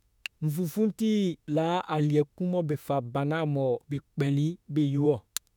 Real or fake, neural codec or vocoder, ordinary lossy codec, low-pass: fake; autoencoder, 48 kHz, 32 numbers a frame, DAC-VAE, trained on Japanese speech; none; 19.8 kHz